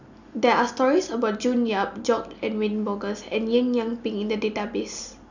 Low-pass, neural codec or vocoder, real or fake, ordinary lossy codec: 7.2 kHz; none; real; none